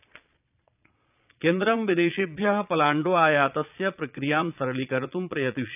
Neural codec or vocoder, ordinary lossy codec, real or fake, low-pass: vocoder, 22.05 kHz, 80 mel bands, Vocos; none; fake; 3.6 kHz